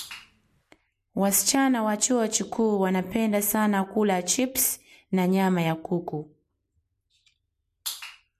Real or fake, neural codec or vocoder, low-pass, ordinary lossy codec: real; none; 14.4 kHz; MP3, 64 kbps